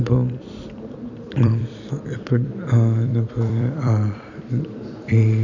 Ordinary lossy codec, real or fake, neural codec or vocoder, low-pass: none; fake; vocoder, 44.1 kHz, 128 mel bands every 256 samples, BigVGAN v2; 7.2 kHz